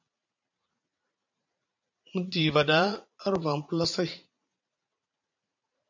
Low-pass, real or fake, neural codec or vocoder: 7.2 kHz; fake; vocoder, 44.1 kHz, 80 mel bands, Vocos